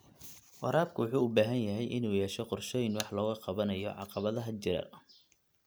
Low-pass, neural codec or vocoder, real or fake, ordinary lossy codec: none; none; real; none